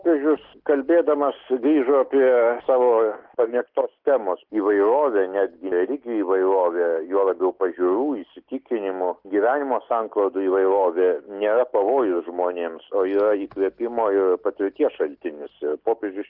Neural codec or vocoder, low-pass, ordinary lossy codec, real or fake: none; 5.4 kHz; Opus, 16 kbps; real